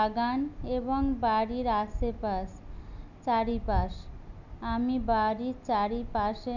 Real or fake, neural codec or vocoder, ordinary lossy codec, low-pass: real; none; none; 7.2 kHz